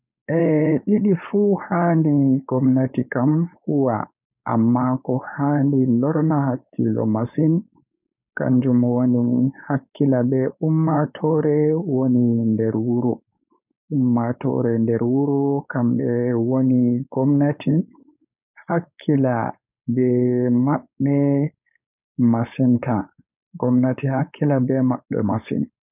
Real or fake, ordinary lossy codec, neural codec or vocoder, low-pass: fake; none; codec, 16 kHz, 4.8 kbps, FACodec; 3.6 kHz